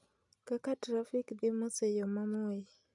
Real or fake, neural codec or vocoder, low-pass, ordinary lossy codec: real; none; 10.8 kHz; none